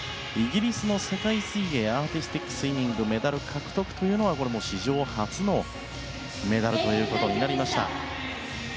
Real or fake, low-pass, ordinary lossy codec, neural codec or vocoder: real; none; none; none